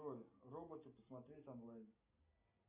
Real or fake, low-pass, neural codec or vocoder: real; 3.6 kHz; none